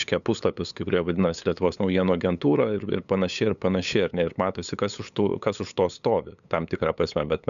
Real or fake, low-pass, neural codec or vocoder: fake; 7.2 kHz; codec, 16 kHz, 8 kbps, FunCodec, trained on LibriTTS, 25 frames a second